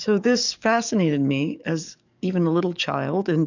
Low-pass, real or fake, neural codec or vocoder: 7.2 kHz; fake; codec, 44.1 kHz, 7.8 kbps, DAC